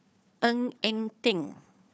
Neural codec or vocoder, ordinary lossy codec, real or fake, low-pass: codec, 16 kHz, 4 kbps, FunCodec, trained on Chinese and English, 50 frames a second; none; fake; none